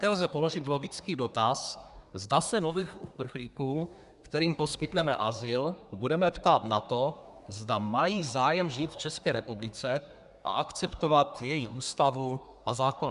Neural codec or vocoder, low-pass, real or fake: codec, 24 kHz, 1 kbps, SNAC; 10.8 kHz; fake